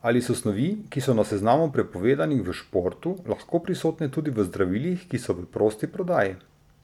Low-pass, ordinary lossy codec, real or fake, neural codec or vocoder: 19.8 kHz; none; real; none